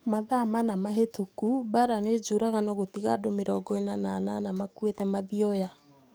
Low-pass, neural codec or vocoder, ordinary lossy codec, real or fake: none; codec, 44.1 kHz, 7.8 kbps, DAC; none; fake